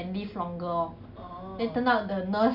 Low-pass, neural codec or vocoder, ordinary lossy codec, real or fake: 5.4 kHz; none; none; real